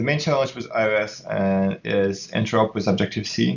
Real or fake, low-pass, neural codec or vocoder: real; 7.2 kHz; none